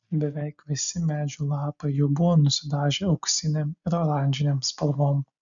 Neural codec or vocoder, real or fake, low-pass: none; real; 7.2 kHz